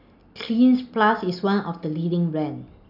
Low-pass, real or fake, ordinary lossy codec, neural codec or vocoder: 5.4 kHz; real; none; none